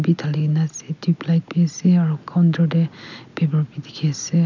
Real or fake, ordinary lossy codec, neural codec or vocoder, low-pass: real; none; none; 7.2 kHz